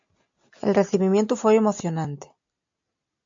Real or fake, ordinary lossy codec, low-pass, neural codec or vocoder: real; AAC, 64 kbps; 7.2 kHz; none